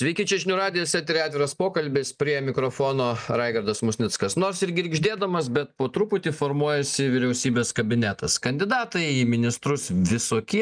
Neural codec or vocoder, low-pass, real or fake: none; 9.9 kHz; real